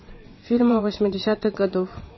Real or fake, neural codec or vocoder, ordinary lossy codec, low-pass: fake; vocoder, 22.05 kHz, 80 mel bands, WaveNeXt; MP3, 24 kbps; 7.2 kHz